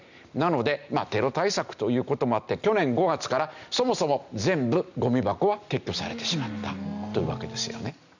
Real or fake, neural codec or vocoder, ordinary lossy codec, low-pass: real; none; none; 7.2 kHz